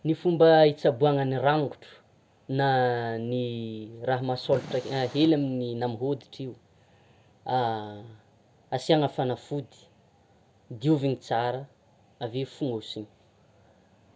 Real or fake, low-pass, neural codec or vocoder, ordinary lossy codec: real; none; none; none